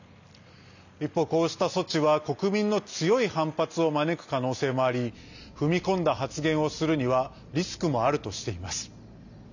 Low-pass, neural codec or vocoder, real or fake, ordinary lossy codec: 7.2 kHz; none; real; none